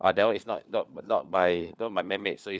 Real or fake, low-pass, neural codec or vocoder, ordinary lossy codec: fake; none; codec, 16 kHz, 4 kbps, FunCodec, trained on LibriTTS, 50 frames a second; none